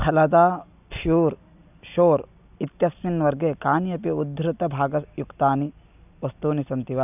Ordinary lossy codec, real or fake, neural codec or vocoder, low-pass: none; real; none; 3.6 kHz